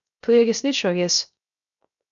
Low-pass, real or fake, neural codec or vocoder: 7.2 kHz; fake; codec, 16 kHz, 0.3 kbps, FocalCodec